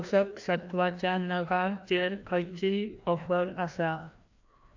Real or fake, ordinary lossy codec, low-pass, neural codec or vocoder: fake; AAC, 48 kbps; 7.2 kHz; codec, 16 kHz, 1 kbps, FreqCodec, larger model